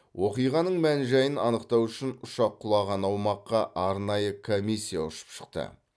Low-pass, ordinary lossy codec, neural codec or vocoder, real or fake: none; none; none; real